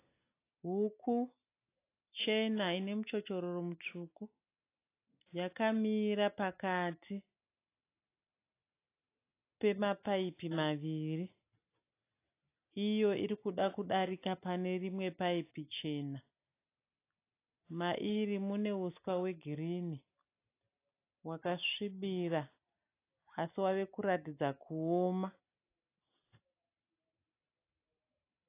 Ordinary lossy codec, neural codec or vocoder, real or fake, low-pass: AAC, 24 kbps; none; real; 3.6 kHz